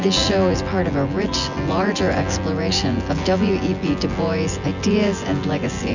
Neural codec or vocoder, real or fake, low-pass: vocoder, 24 kHz, 100 mel bands, Vocos; fake; 7.2 kHz